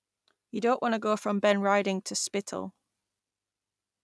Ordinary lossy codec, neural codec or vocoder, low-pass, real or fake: none; none; none; real